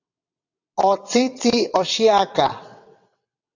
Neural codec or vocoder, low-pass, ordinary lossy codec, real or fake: vocoder, 22.05 kHz, 80 mel bands, WaveNeXt; 7.2 kHz; AAC, 48 kbps; fake